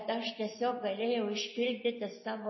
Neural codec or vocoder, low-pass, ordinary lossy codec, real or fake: none; 7.2 kHz; MP3, 24 kbps; real